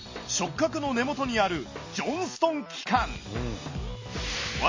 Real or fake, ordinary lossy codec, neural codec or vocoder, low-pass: real; MP3, 32 kbps; none; 7.2 kHz